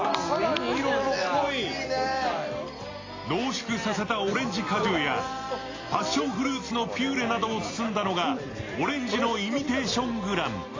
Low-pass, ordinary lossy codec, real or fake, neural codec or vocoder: 7.2 kHz; AAC, 32 kbps; real; none